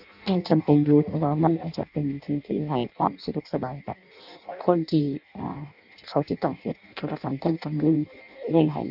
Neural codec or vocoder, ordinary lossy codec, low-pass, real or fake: codec, 16 kHz in and 24 kHz out, 0.6 kbps, FireRedTTS-2 codec; none; 5.4 kHz; fake